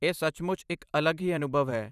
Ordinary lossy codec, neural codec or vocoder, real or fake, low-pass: none; vocoder, 44.1 kHz, 128 mel bands every 512 samples, BigVGAN v2; fake; 14.4 kHz